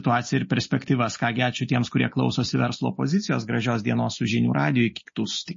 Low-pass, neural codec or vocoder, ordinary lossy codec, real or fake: 7.2 kHz; none; MP3, 32 kbps; real